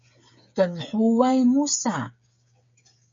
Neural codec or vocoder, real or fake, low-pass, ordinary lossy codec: codec, 16 kHz, 8 kbps, FreqCodec, smaller model; fake; 7.2 kHz; MP3, 48 kbps